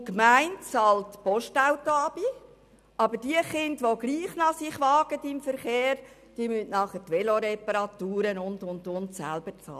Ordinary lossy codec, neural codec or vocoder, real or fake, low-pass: none; none; real; 14.4 kHz